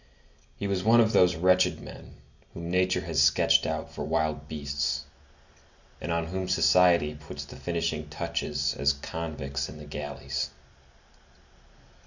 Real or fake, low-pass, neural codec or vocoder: real; 7.2 kHz; none